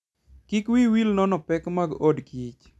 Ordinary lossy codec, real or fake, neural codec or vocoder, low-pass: none; real; none; none